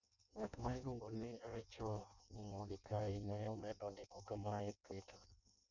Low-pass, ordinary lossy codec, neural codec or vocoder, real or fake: 7.2 kHz; none; codec, 16 kHz in and 24 kHz out, 0.6 kbps, FireRedTTS-2 codec; fake